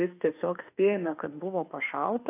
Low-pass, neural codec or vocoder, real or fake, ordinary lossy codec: 3.6 kHz; autoencoder, 48 kHz, 32 numbers a frame, DAC-VAE, trained on Japanese speech; fake; AAC, 24 kbps